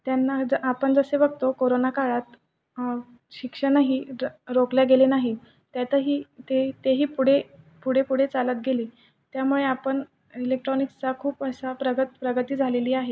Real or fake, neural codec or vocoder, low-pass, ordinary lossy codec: real; none; none; none